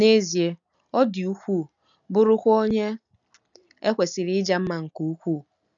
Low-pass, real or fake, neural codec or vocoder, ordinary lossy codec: 7.2 kHz; real; none; none